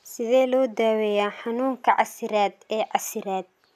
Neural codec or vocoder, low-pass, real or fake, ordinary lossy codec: none; 14.4 kHz; real; none